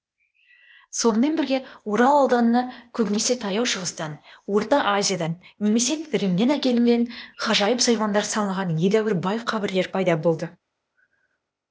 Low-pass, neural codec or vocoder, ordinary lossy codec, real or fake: none; codec, 16 kHz, 0.8 kbps, ZipCodec; none; fake